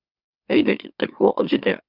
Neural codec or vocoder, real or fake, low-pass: autoencoder, 44.1 kHz, a latent of 192 numbers a frame, MeloTTS; fake; 5.4 kHz